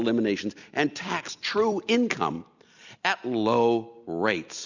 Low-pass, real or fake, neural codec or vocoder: 7.2 kHz; real; none